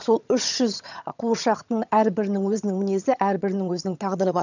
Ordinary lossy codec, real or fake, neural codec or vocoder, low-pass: none; fake; vocoder, 22.05 kHz, 80 mel bands, HiFi-GAN; 7.2 kHz